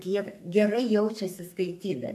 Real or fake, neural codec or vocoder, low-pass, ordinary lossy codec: fake; codec, 32 kHz, 1.9 kbps, SNAC; 14.4 kHz; AAC, 96 kbps